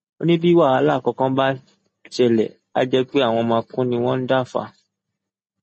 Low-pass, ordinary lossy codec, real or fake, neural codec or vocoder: 10.8 kHz; MP3, 32 kbps; real; none